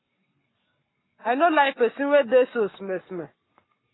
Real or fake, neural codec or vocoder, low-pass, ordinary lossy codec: fake; codec, 44.1 kHz, 7.8 kbps, Pupu-Codec; 7.2 kHz; AAC, 16 kbps